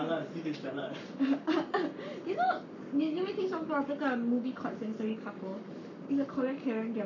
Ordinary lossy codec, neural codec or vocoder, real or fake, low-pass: none; codec, 44.1 kHz, 7.8 kbps, Pupu-Codec; fake; 7.2 kHz